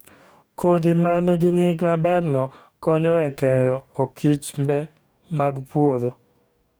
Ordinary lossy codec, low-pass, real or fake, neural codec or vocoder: none; none; fake; codec, 44.1 kHz, 2.6 kbps, DAC